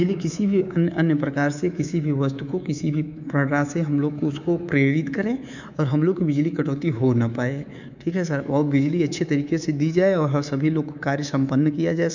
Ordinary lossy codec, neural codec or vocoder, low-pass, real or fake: none; codec, 24 kHz, 3.1 kbps, DualCodec; 7.2 kHz; fake